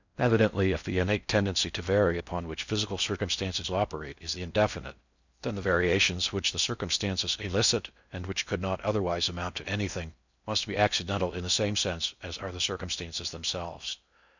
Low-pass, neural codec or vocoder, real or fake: 7.2 kHz; codec, 16 kHz in and 24 kHz out, 0.6 kbps, FocalCodec, streaming, 4096 codes; fake